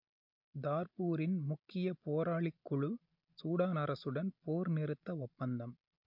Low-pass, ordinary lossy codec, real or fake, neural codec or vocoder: 5.4 kHz; MP3, 48 kbps; real; none